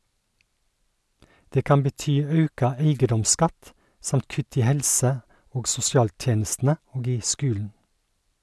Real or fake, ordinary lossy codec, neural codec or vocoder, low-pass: real; none; none; none